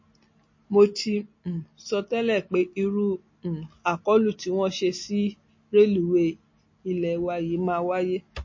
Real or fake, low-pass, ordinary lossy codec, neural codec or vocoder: real; 7.2 kHz; MP3, 32 kbps; none